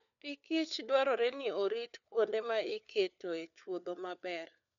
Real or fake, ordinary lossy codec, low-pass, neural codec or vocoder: fake; none; 7.2 kHz; codec, 16 kHz, 4 kbps, FunCodec, trained on LibriTTS, 50 frames a second